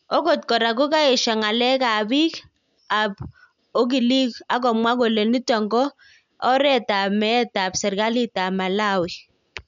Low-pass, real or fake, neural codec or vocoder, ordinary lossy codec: 7.2 kHz; real; none; none